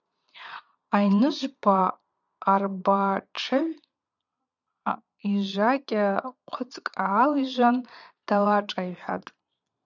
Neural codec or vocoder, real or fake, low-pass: vocoder, 22.05 kHz, 80 mel bands, Vocos; fake; 7.2 kHz